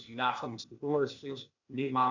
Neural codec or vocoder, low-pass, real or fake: codec, 16 kHz, 0.8 kbps, ZipCodec; 7.2 kHz; fake